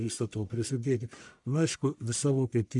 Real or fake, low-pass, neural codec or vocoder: fake; 10.8 kHz; codec, 44.1 kHz, 1.7 kbps, Pupu-Codec